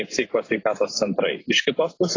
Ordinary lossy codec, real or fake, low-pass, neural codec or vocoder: AAC, 32 kbps; real; 7.2 kHz; none